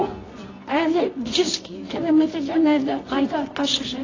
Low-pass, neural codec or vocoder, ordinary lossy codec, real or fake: 7.2 kHz; codec, 24 kHz, 0.9 kbps, WavTokenizer, medium music audio release; AAC, 32 kbps; fake